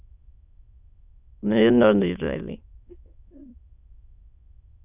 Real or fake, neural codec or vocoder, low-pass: fake; autoencoder, 22.05 kHz, a latent of 192 numbers a frame, VITS, trained on many speakers; 3.6 kHz